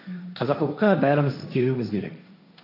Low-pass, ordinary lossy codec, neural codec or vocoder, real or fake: 5.4 kHz; none; codec, 16 kHz, 1.1 kbps, Voila-Tokenizer; fake